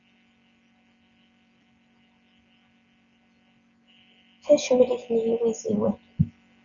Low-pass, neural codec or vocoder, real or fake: 7.2 kHz; none; real